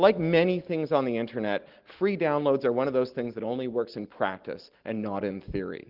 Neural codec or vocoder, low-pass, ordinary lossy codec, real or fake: none; 5.4 kHz; Opus, 16 kbps; real